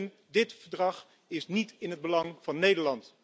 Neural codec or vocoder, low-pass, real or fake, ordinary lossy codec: none; none; real; none